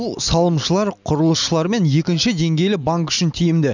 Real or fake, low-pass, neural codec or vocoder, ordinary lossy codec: real; 7.2 kHz; none; none